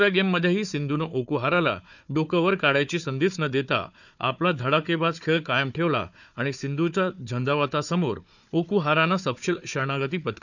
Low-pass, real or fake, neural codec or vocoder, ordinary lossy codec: 7.2 kHz; fake; codec, 16 kHz, 4 kbps, FunCodec, trained on Chinese and English, 50 frames a second; none